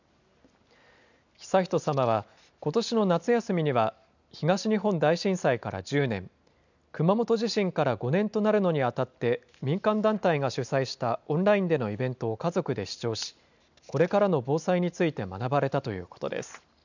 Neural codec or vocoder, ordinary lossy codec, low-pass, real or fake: none; none; 7.2 kHz; real